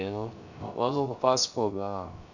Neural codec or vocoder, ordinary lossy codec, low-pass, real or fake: codec, 16 kHz, 0.3 kbps, FocalCodec; none; 7.2 kHz; fake